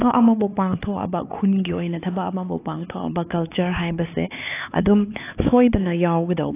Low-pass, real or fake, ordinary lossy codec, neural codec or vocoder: 3.6 kHz; fake; AAC, 24 kbps; codec, 16 kHz, 4 kbps, X-Codec, HuBERT features, trained on LibriSpeech